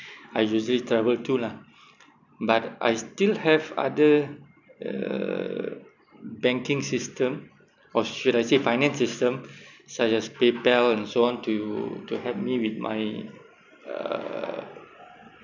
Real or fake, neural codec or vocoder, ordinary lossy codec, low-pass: real; none; none; 7.2 kHz